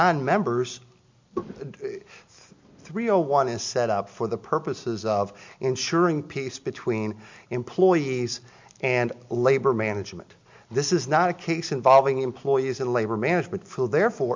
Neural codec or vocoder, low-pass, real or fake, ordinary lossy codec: none; 7.2 kHz; real; MP3, 64 kbps